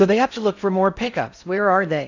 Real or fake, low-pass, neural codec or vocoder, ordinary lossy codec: fake; 7.2 kHz; codec, 16 kHz in and 24 kHz out, 0.6 kbps, FocalCodec, streaming, 4096 codes; AAC, 48 kbps